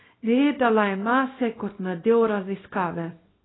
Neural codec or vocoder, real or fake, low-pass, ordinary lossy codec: codec, 24 kHz, 0.9 kbps, WavTokenizer, small release; fake; 7.2 kHz; AAC, 16 kbps